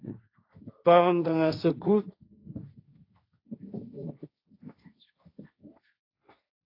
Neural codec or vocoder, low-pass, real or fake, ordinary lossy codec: codec, 16 kHz, 1.1 kbps, Voila-Tokenizer; 5.4 kHz; fake; AAC, 32 kbps